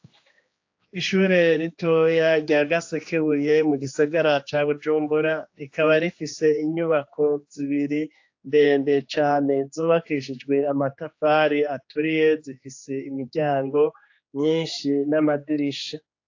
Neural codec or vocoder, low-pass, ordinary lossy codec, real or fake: codec, 16 kHz, 2 kbps, X-Codec, HuBERT features, trained on general audio; 7.2 kHz; AAC, 48 kbps; fake